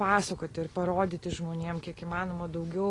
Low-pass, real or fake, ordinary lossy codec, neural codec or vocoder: 10.8 kHz; real; AAC, 32 kbps; none